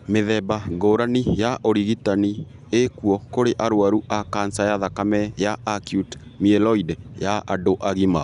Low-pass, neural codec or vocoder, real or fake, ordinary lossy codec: 10.8 kHz; none; real; none